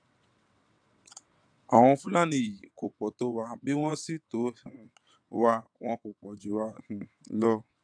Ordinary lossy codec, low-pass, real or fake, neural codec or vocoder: none; 9.9 kHz; fake; vocoder, 22.05 kHz, 80 mel bands, WaveNeXt